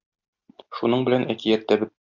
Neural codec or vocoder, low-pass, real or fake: none; 7.2 kHz; real